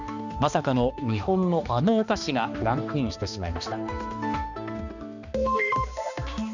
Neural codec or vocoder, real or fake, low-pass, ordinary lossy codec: codec, 16 kHz, 2 kbps, X-Codec, HuBERT features, trained on balanced general audio; fake; 7.2 kHz; none